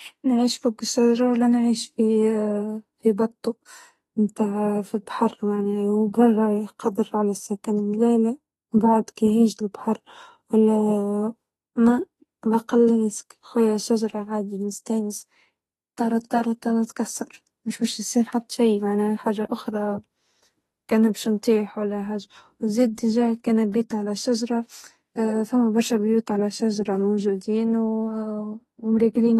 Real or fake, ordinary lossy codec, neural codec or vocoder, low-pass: fake; AAC, 32 kbps; autoencoder, 48 kHz, 32 numbers a frame, DAC-VAE, trained on Japanese speech; 19.8 kHz